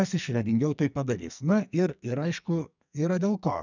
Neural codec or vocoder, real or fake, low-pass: codec, 44.1 kHz, 2.6 kbps, SNAC; fake; 7.2 kHz